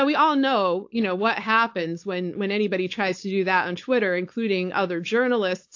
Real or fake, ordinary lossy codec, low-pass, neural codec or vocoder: fake; AAC, 48 kbps; 7.2 kHz; codec, 16 kHz, 4.8 kbps, FACodec